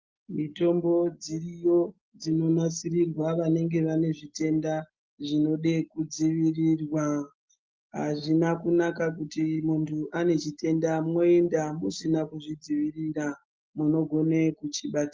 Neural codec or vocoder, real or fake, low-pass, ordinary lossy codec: none; real; 7.2 kHz; Opus, 24 kbps